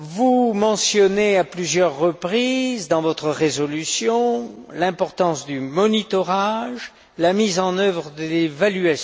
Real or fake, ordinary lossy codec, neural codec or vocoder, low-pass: real; none; none; none